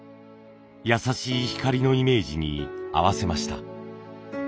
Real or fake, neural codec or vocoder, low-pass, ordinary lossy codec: real; none; none; none